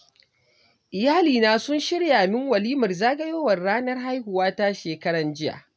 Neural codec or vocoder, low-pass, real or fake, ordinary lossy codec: none; none; real; none